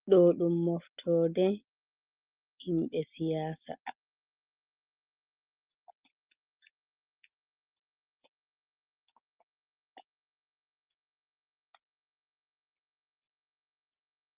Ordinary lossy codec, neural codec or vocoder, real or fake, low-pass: Opus, 32 kbps; none; real; 3.6 kHz